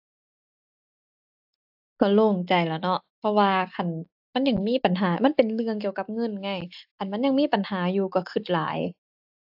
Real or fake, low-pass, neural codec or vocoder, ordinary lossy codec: real; 5.4 kHz; none; AAC, 48 kbps